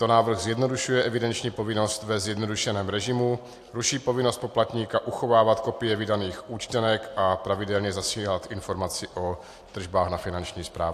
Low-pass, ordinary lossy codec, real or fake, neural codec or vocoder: 14.4 kHz; AAC, 64 kbps; real; none